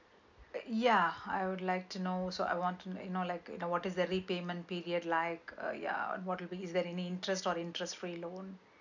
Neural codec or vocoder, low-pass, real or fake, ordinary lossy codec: none; 7.2 kHz; real; none